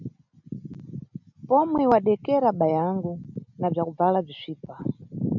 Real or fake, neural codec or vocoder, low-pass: real; none; 7.2 kHz